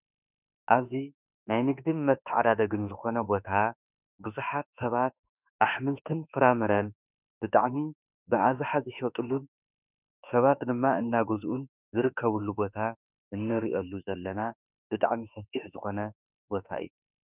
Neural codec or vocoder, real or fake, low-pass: autoencoder, 48 kHz, 32 numbers a frame, DAC-VAE, trained on Japanese speech; fake; 3.6 kHz